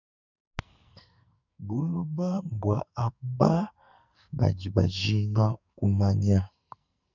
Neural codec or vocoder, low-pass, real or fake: codec, 44.1 kHz, 2.6 kbps, SNAC; 7.2 kHz; fake